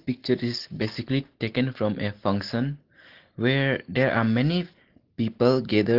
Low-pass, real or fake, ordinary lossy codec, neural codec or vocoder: 5.4 kHz; real; Opus, 16 kbps; none